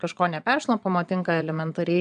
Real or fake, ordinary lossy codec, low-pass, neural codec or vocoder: fake; MP3, 96 kbps; 9.9 kHz; vocoder, 22.05 kHz, 80 mel bands, Vocos